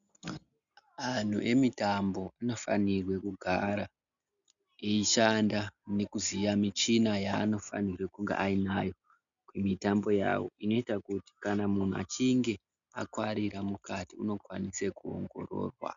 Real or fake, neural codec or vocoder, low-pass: real; none; 7.2 kHz